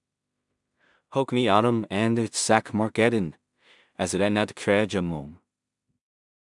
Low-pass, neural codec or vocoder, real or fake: 10.8 kHz; codec, 16 kHz in and 24 kHz out, 0.4 kbps, LongCat-Audio-Codec, two codebook decoder; fake